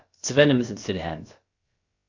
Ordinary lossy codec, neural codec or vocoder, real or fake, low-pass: Opus, 64 kbps; codec, 16 kHz, about 1 kbps, DyCAST, with the encoder's durations; fake; 7.2 kHz